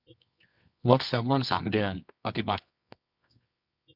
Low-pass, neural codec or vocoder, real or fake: 5.4 kHz; codec, 24 kHz, 0.9 kbps, WavTokenizer, medium music audio release; fake